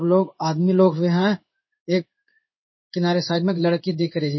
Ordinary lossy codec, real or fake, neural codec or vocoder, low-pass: MP3, 24 kbps; fake; codec, 16 kHz in and 24 kHz out, 1 kbps, XY-Tokenizer; 7.2 kHz